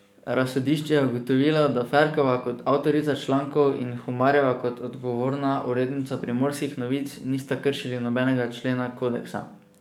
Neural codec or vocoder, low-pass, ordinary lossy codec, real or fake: codec, 44.1 kHz, 7.8 kbps, DAC; 19.8 kHz; none; fake